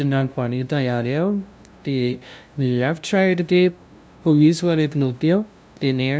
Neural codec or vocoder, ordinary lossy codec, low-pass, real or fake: codec, 16 kHz, 0.5 kbps, FunCodec, trained on LibriTTS, 25 frames a second; none; none; fake